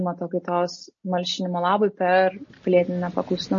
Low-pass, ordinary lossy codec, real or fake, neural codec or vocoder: 7.2 kHz; MP3, 32 kbps; real; none